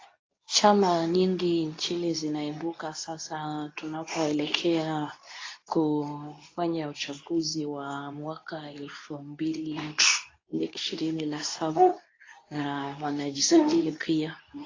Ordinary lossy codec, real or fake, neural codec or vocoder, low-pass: AAC, 32 kbps; fake; codec, 24 kHz, 0.9 kbps, WavTokenizer, medium speech release version 2; 7.2 kHz